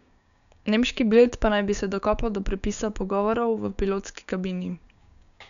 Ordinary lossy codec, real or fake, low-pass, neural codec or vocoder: none; fake; 7.2 kHz; codec, 16 kHz, 6 kbps, DAC